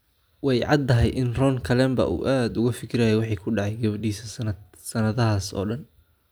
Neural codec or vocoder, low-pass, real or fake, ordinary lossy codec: none; none; real; none